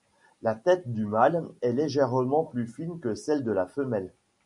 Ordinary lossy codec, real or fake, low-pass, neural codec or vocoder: MP3, 64 kbps; real; 10.8 kHz; none